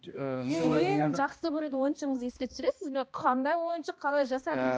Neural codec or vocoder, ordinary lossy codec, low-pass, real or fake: codec, 16 kHz, 1 kbps, X-Codec, HuBERT features, trained on balanced general audio; none; none; fake